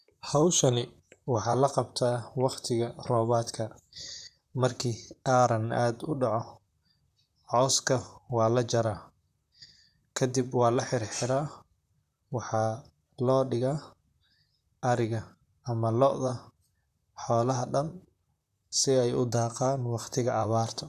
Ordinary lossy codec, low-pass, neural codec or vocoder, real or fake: none; 14.4 kHz; vocoder, 44.1 kHz, 128 mel bands, Pupu-Vocoder; fake